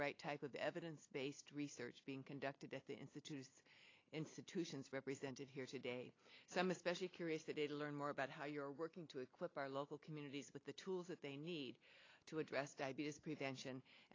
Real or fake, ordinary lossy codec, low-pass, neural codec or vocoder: real; AAC, 32 kbps; 7.2 kHz; none